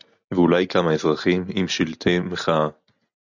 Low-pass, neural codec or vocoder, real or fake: 7.2 kHz; none; real